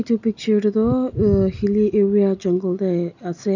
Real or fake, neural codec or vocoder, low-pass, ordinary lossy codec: real; none; 7.2 kHz; none